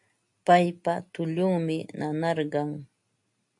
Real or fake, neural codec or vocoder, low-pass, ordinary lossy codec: real; none; 10.8 kHz; AAC, 64 kbps